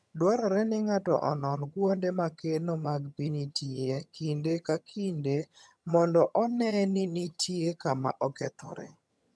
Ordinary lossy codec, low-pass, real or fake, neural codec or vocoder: none; none; fake; vocoder, 22.05 kHz, 80 mel bands, HiFi-GAN